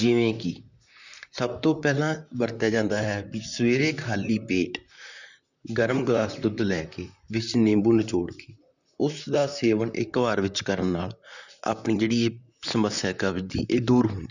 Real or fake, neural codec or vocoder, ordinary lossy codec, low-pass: fake; vocoder, 44.1 kHz, 128 mel bands, Pupu-Vocoder; none; 7.2 kHz